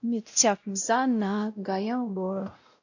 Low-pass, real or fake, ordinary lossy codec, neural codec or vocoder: 7.2 kHz; fake; AAC, 48 kbps; codec, 16 kHz, 0.5 kbps, X-Codec, WavLM features, trained on Multilingual LibriSpeech